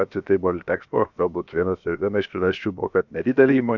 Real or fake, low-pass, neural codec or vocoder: fake; 7.2 kHz; codec, 16 kHz, 0.7 kbps, FocalCodec